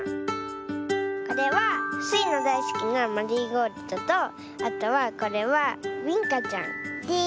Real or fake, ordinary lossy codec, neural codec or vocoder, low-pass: real; none; none; none